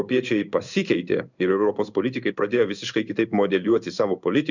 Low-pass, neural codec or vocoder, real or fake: 7.2 kHz; codec, 16 kHz in and 24 kHz out, 1 kbps, XY-Tokenizer; fake